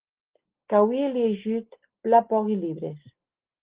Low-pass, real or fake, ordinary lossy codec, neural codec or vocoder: 3.6 kHz; real; Opus, 16 kbps; none